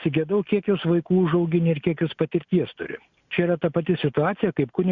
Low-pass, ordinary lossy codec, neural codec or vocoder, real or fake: 7.2 kHz; Opus, 64 kbps; none; real